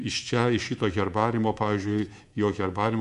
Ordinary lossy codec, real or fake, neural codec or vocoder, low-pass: MP3, 64 kbps; fake; codec, 24 kHz, 3.1 kbps, DualCodec; 10.8 kHz